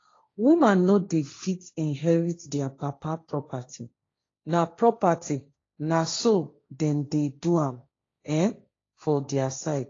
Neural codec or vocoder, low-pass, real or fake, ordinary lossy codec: codec, 16 kHz, 1.1 kbps, Voila-Tokenizer; 7.2 kHz; fake; AAC, 32 kbps